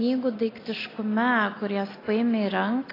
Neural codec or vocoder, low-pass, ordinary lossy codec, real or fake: none; 5.4 kHz; AAC, 24 kbps; real